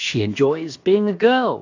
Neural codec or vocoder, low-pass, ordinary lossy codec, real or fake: codec, 16 kHz, about 1 kbps, DyCAST, with the encoder's durations; 7.2 kHz; AAC, 48 kbps; fake